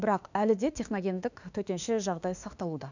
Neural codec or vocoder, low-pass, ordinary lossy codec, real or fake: autoencoder, 48 kHz, 32 numbers a frame, DAC-VAE, trained on Japanese speech; 7.2 kHz; none; fake